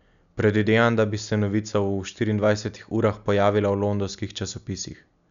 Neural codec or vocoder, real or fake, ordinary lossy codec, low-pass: none; real; none; 7.2 kHz